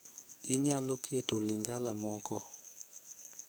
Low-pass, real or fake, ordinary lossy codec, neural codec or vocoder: none; fake; none; codec, 44.1 kHz, 2.6 kbps, SNAC